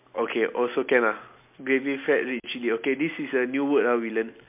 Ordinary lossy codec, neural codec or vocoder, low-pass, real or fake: MP3, 32 kbps; none; 3.6 kHz; real